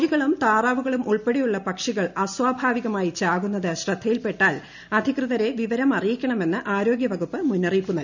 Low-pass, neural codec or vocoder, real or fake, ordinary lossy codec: 7.2 kHz; none; real; none